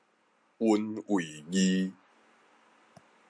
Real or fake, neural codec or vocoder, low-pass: real; none; 9.9 kHz